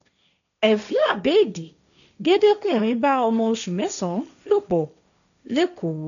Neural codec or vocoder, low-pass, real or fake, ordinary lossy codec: codec, 16 kHz, 1.1 kbps, Voila-Tokenizer; 7.2 kHz; fake; none